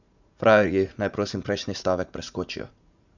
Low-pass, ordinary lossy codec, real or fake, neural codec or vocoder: 7.2 kHz; none; fake; vocoder, 44.1 kHz, 80 mel bands, Vocos